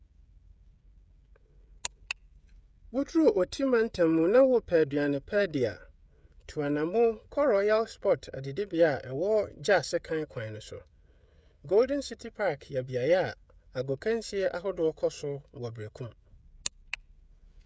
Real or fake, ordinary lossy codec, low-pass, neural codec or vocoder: fake; none; none; codec, 16 kHz, 16 kbps, FreqCodec, smaller model